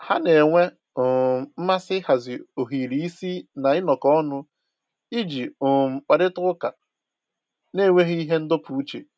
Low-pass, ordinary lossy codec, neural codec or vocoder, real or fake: none; none; none; real